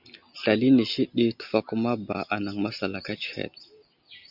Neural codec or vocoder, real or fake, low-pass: none; real; 5.4 kHz